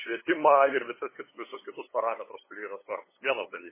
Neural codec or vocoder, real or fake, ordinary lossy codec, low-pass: codec, 16 kHz, 8 kbps, FunCodec, trained on LibriTTS, 25 frames a second; fake; MP3, 16 kbps; 3.6 kHz